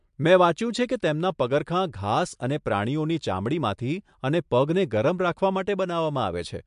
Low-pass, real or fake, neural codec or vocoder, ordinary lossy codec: 10.8 kHz; real; none; MP3, 64 kbps